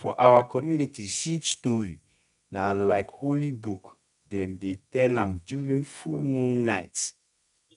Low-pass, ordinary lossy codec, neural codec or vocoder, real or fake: 10.8 kHz; none; codec, 24 kHz, 0.9 kbps, WavTokenizer, medium music audio release; fake